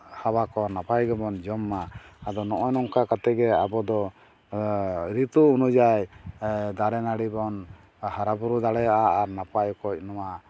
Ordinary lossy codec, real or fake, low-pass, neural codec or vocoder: none; real; none; none